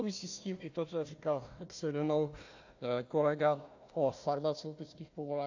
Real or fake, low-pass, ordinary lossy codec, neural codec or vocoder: fake; 7.2 kHz; AAC, 48 kbps; codec, 16 kHz, 1 kbps, FunCodec, trained on Chinese and English, 50 frames a second